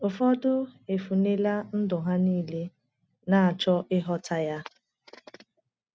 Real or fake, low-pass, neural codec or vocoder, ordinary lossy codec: real; none; none; none